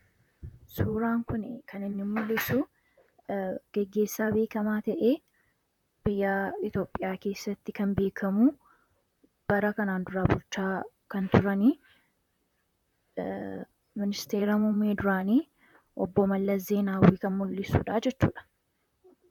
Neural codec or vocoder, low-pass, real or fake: vocoder, 44.1 kHz, 128 mel bands, Pupu-Vocoder; 19.8 kHz; fake